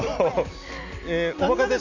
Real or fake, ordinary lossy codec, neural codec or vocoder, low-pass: real; none; none; 7.2 kHz